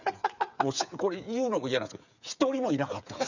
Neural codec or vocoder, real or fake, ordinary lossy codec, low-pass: codec, 16 kHz, 8 kbps, FreqCodec, smaller model; fake; none; 7.2 kHz